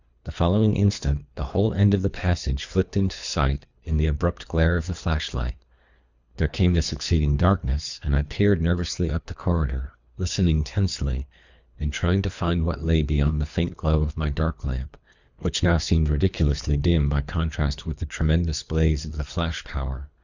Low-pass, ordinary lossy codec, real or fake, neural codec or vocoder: 7.2 kHz; Opus, 64 kbps; fake; codec, 24 kHz, 3 kbps, HILCodec